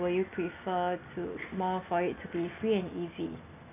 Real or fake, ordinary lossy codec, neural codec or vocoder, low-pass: real; none; none; 3.6 kHz